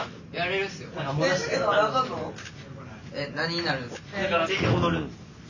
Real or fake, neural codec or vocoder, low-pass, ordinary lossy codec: real; none; 7.2 kHz; none